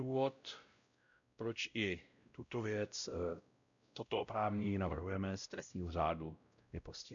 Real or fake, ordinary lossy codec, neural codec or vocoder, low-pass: fake; MP3, 96 kbps; codec, 16 kHz, 0.5 kbps, X-Codec, WavLM features, trained on Multilingual LibriSpeech; 7.2 kHz